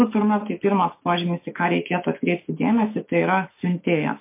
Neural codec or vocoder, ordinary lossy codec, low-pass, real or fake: autoencoder, 48 kHz, 128 numbers a frame, DAC-VAE, trained on Japanese speech; MP3, 24 kbps; 3.6 kHz; fake